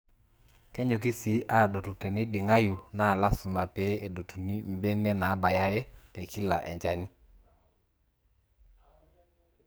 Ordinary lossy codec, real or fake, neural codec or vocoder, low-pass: none; fake; codec, 44.1 kHz, 2.6 kbps, SNAC; none